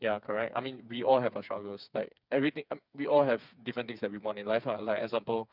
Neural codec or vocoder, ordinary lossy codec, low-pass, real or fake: codec, 16 kHz, 4 kbps, FreqCodec, smaller model; none; 5.4 kHz; fake